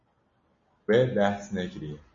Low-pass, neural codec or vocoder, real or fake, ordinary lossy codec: 7.2 kHz; none; real; MP3, 32 kbps